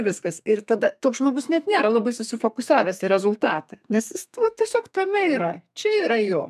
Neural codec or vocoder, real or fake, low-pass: codec, 32 kHz, 1.9 kbps, SNAC; fake; 14.4 kHz